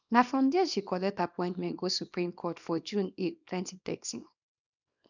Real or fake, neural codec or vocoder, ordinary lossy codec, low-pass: fake; codec, 24 kHz, 0.9 kbps, WavTokenizer, small release; none; 7.2 kHz